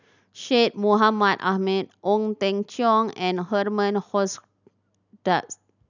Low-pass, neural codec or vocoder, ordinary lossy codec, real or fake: 7.2 kHz; none; none; real